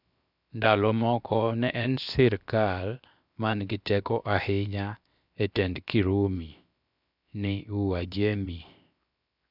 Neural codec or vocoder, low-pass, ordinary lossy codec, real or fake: codec, 16 kHz, 0.7 kbps, FocalCodec; 5.4 kHz; none; fake